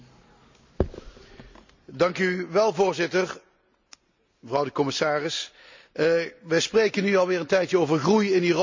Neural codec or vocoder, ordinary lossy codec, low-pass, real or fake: none; MP3, 64 kbps; 7.2 kHz; real